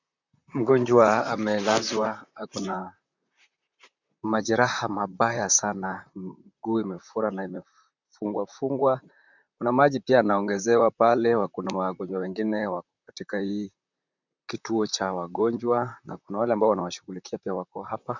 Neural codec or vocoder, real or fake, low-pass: vocoder, 44.1 kHz, 128 mel bands, Pupu-Vocoder; fake; 7.2 kHz